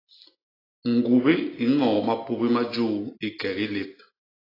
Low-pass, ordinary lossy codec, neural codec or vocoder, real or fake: 5.4 kHz; AAC, 24 kbps; none; real